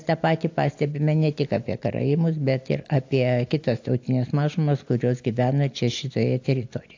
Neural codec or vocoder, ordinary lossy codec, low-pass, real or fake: none; AAC, 48 kbps; 7.2 kHz; real